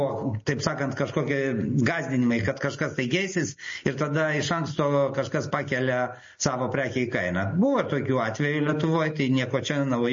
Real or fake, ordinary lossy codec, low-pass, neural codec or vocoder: real; MP3, 32 kbps; 7.2 kHz; none